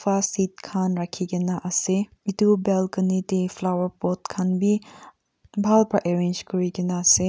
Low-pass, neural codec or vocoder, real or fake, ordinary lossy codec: none; none; real; none